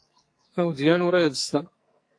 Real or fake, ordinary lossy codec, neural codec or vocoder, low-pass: fake; AAC, 48 kbps; codec, 44.1 kHz, 2.6 kbps, SNAC; 9.9 kHz